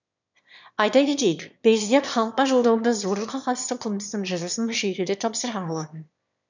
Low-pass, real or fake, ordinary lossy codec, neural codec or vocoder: 7.2 kHz; fake; none; autoencoder, 22.05 kHz, a latent of 192 numbers a frame, VITS, trained on one speaker